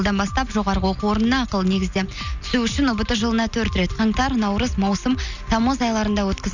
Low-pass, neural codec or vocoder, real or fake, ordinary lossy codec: 7.2 kHz; none; real; none